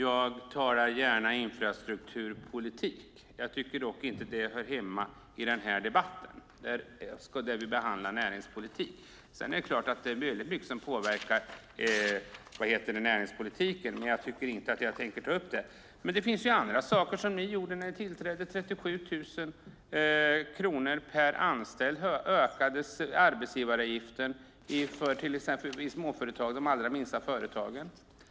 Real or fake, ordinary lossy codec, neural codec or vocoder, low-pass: real; none; none; none